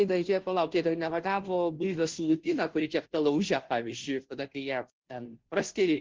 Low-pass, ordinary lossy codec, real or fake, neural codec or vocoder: 7.2 kHz; Opus, 16 kbps; fake; codec, 16 kHz, 0.5 kbps, FunCodec, trained on Chinese and English, 25 frames a second